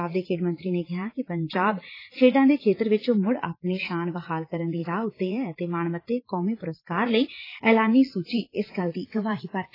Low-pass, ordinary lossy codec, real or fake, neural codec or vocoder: 5.4 kHz; AAC, 24 kbps; fake; vocoder, 44.1 kHz, 80 mel bands, Vocos